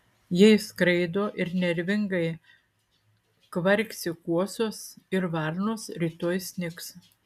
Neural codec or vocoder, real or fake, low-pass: none; real; 14.4 kHz